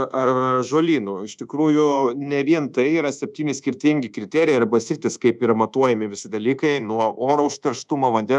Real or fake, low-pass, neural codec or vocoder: fake; 10.8 kHz; codec, 24 kHz, 1.2 kbps, DualCodec